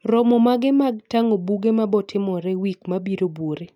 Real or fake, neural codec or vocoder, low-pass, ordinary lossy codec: real; none; 19.8 kHz; none